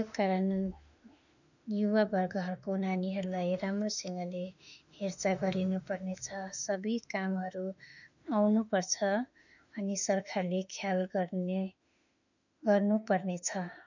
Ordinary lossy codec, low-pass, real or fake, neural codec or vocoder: none; 7.2 kHz; fake; autoencoder, 48 kHz, 32 numbers a frame, DAC-VAE, trained on Japanese speech